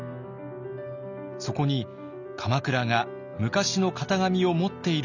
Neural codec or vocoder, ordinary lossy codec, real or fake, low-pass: none; none; real; 7.2 kHz